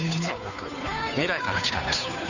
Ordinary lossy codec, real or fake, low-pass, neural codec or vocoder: none; fake; 7.2 kHz; codec, 16 kHz, 2 kbps, FunCodec, trained on Chinese and English, 25 frames a second